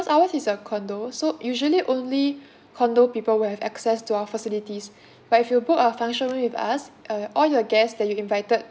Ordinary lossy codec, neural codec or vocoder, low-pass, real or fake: none; none; none; real